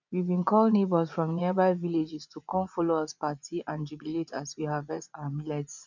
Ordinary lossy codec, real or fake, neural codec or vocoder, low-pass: none; fake; vocoder, 24 kHz, 100 mel bands, Vocos; 7.2 kHz